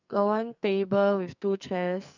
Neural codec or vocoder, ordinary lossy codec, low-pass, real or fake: codec, 44.1 kHz, 2.6 kbps, SNAC; none; 7.2 kHz; fake